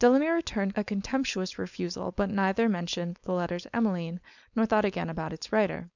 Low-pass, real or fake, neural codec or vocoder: 7.2 kHz; fake; codec, 16 kHz, 4.8 kbps, FACodec